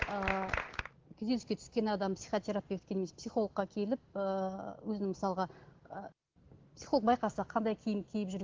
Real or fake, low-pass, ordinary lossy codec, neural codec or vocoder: real; 7.2 kHz; Opus, 16 kbps; none